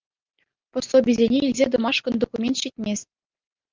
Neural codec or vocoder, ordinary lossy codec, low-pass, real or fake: none; Opus, 32 kbps; 7.2 kHz; real